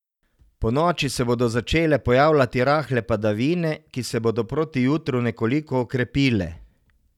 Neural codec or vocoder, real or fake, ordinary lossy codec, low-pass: none; real; none; 19.8 kHz